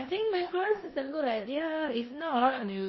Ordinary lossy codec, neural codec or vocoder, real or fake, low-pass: MP3, 24 kbps; codec, 16 kHz in and 24 kHz out, 0.9 kbps, LongCat-Audio-Codec, four codebook decoder; fake; 7.2 kHz